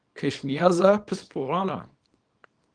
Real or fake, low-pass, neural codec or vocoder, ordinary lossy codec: fake; 9.9 kHz; codec, 24 kHz, 0.9 kbps, WavTokenizer, small release; Opus, 32 kbps